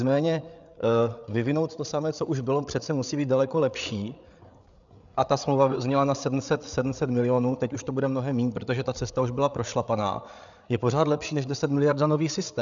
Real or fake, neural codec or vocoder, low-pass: fake; codec, 16 kHz, 8 kbps, FreqCodec, larger model; 7.2 kHz